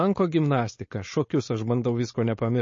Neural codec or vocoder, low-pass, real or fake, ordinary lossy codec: codec, 16 kHz, 4.8 kbps, FACodec; 7.2 kHz; fake; MP3, 32 kbps